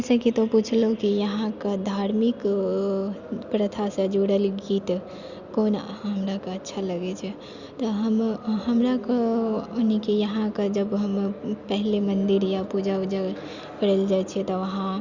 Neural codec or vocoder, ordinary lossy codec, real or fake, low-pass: none; Opus, 64 kbps; real; 7.2 kHz